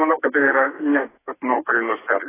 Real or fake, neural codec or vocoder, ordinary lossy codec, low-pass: fake; codec, 32 kHz, 1.9 kbps, SNAC; AAC, 16 kbps; 3.6 kHz